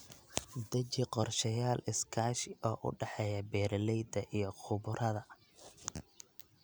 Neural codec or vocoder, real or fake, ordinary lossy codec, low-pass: none; real; none; none